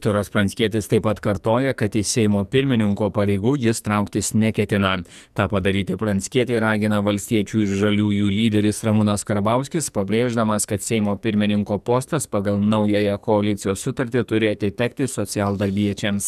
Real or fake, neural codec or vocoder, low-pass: fake; codec, 44.1 kHz, 2.6 kbps, SNAC; 14.4 kHz